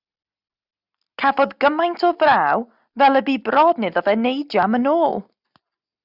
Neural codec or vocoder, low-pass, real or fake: none; 5.4 kHz; real